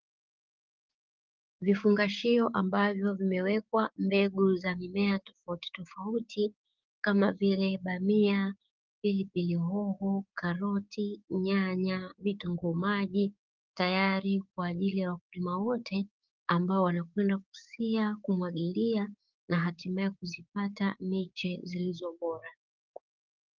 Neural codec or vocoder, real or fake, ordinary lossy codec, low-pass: codec, 16 kHz, 6 kbps, DAC; fake; Opus, 32 kbps; 7.2 kHz